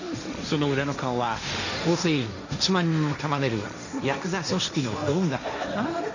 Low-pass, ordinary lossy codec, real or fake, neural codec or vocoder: 7.2 kHz; none; fake; codec, 16 kHz, 1.1 kbps, Voila-Tokenizer